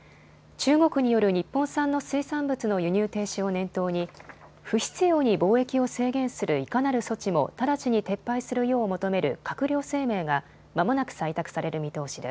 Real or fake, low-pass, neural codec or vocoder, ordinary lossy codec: real; none; none; none